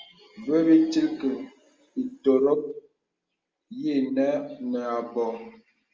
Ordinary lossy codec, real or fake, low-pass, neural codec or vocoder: Opus, 32 kbps; real; 7.2 kHz; none